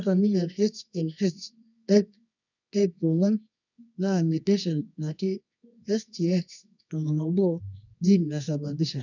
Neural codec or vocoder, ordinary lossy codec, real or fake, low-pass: codec, 24 kHz, 0.9 kbps, WavTokenizer, medium music audio release; none; fake; 7.2 kHz